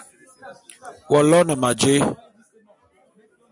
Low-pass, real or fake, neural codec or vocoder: 10.8 kHz; real; none